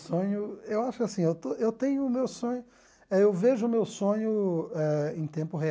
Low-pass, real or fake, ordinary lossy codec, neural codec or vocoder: none; real; none; none